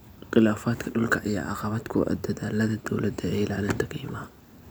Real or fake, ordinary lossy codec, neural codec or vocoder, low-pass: real; none; none; none